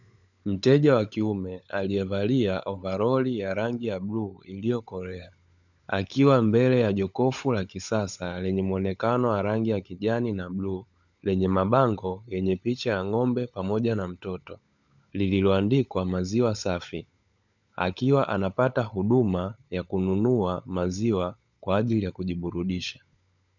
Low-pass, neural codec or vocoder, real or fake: 7.2 kHz; codec, 16 kHz, 16 kbps, FunCodec, trained on LibriTTS, 50 frames a second; fake